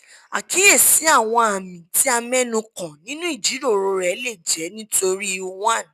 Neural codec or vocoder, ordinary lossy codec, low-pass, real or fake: none; none; 14.4 kHz; real